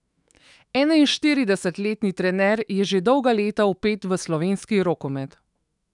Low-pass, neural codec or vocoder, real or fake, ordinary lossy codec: 10.8 kHz; autoencoder, 48 kHz, 128 numbers a frame, DAC-VAE, trained on Japanese speech; fake; none